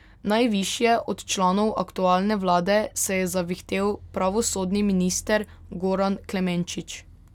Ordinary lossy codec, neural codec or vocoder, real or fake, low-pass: none; none; real; 19.8 kHz